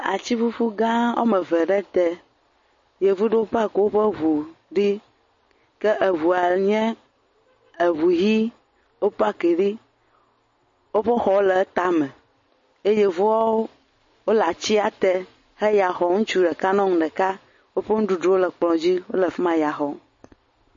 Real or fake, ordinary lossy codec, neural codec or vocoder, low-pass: real; MP3, 32 kbps; none; 7.2 kHz